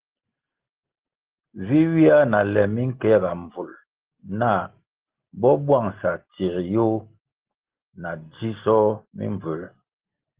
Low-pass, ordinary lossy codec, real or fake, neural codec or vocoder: 3.6 kHz; Opus, 16 kbps; real; none